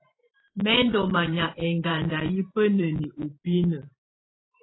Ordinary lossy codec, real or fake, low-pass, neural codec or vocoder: AAC, 16 kbps; fake; 7.2 kHz; vocoder, 44.1 kHz, 128 mel bands every 512 samples, BigVGAN v2